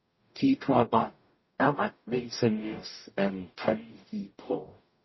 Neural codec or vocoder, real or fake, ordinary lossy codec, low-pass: codec, 44.1 kHz, 0.9 kbps, DAC; fake; MP3, 24 kbps; 7.2 kHz